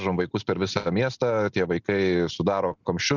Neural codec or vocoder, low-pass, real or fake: none; 7.2 kHz; real